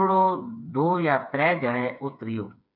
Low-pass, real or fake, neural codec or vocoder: 5.4 kHz; fake; codec, 16 kHz, 4 kbps, FreqCodec, smaller model